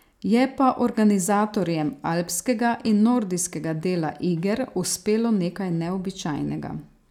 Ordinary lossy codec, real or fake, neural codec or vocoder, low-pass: none; real; none; 19.8 kHz